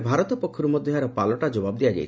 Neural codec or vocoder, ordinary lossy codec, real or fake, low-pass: none; none; real; none